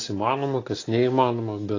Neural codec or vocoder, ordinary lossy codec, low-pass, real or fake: none; AAC, 32 kbps; 7.2 kHz; real